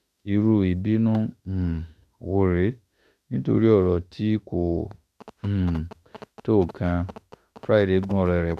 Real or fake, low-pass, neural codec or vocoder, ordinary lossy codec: fake; 14.4 kHz; autoencoder, 48 kHz, 32 numbers a frame, DAC-VAE, trained on Japanese speech; none